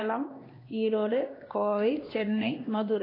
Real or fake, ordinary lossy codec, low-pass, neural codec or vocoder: fake; AAC, 24 kbps; 5.4 kHz; codec, 16 kHz, 2 kbps, X-Codec, HuBERT features, trained on LibriSpeech